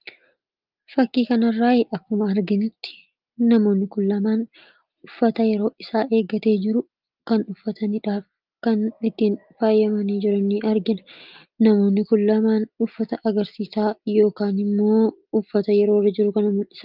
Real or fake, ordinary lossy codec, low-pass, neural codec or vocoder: real; Opus, 32 kbps; 5.4 kHz; none